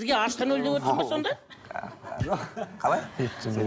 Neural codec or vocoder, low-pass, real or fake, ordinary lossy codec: none; none; real; none